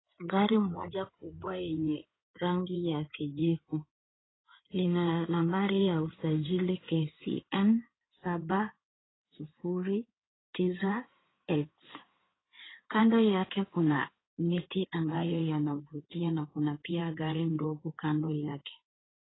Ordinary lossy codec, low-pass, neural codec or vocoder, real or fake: AAC, 16 kbps; 7.2 kHz; codec, 16 kHz in and 24 kHz out, 2.2 kbps, FireRedTTS-2 codec; fake